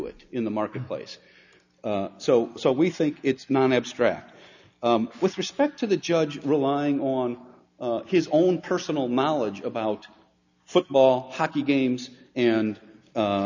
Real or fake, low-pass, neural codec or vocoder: real; 7.2 kHz; none